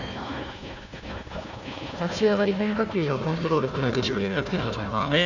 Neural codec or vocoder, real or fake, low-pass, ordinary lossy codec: codec, 16 kHz, 1 kbps, FunCodec, trained on Chinese and English, 50 frames a second; fake; 7.2 kHz; none